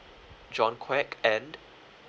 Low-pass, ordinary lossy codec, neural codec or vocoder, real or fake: none; none; none; real